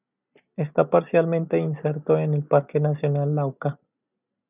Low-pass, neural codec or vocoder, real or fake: 3.6 kHz; none; real